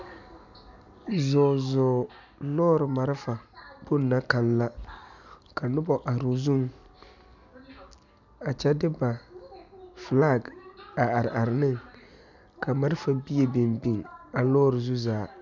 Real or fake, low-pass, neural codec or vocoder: real; 7.2 kHz; none